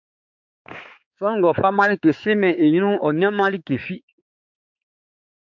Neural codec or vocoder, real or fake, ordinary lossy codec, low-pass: codec, 16 kHz, 4 kbps, X-Codec, HuBERT features, trained on balanced general audio; fake; MP3, 64 kbps; 7.2 kHz